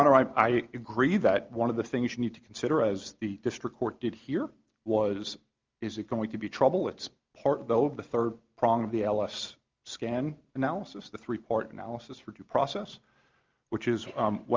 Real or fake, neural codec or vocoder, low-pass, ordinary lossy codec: real; none; 7.2 kHz; Opus, 32 kbps